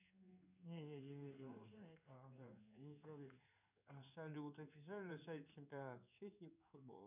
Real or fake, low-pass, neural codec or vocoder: fake; 3.6 kHz; codec, 16 kHz in and 24 kHz out, 1 kbps, XY-Tokenizer